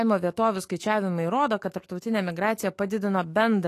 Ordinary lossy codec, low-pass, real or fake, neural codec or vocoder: AAC, 48 kbps; 14.4 kHz; fake; autoencoder, 48 kHz, 128 numbers a frame, DAC-VAE, trained on Japanese speech